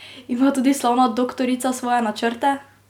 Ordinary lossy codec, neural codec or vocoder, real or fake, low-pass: none; none; real; 19.8 kHz